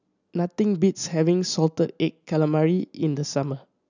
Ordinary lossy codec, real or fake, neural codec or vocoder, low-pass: none; real; none; 7.2 kHz